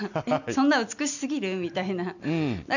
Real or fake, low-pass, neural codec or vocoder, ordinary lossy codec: real; 7.2 kHz; none; none